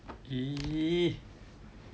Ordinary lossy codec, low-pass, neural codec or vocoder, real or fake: none; none; none; real